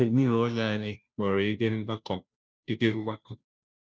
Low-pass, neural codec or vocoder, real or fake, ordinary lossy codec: none; codec, 16 kHz, 0.5 kbps, FunCodec, trained on Chinese and English, 25 frames a second; fake; none